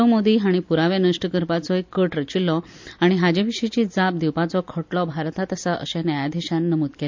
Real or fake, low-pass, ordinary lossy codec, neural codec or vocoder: real; 7.2 kHz; MP3, 64 kbps; none